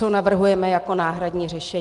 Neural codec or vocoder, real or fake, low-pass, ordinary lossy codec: none; real; 10.8 kHz; Opus, 24 kbps